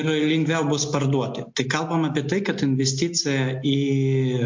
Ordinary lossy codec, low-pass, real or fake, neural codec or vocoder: MP3, 48 kbps; 7.2 kHz; real; none